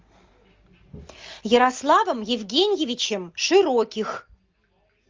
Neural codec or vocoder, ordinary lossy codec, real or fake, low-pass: none; Opus, 32 kbps; real; 7.2 kHz